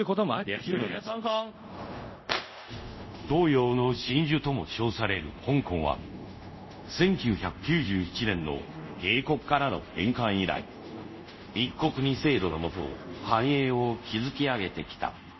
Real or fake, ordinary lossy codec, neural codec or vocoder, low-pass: fake; MP3, 24 kbps; codec, 24 kHz, 0.5 kbps, DualCodec; 7.2 kHz